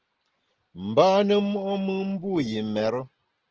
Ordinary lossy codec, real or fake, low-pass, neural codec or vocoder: Opus, 16 kbps; real; 7.2 kHz; none